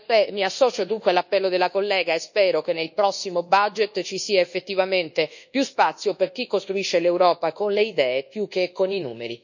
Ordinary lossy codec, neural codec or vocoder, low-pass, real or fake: none; codec, 24 kHz, 1.2 kbps, DualCodec; 7.2 kHz; fake